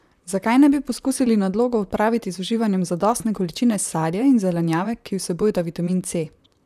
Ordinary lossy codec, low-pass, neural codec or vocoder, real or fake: none; 14.4 kHz; vocoder, 44.1 kHz, 128 mel bands, Pupu-Vocoder; fake